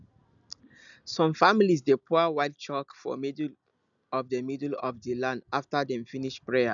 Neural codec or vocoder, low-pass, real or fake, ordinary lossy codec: none; 7.2 kHz; real; none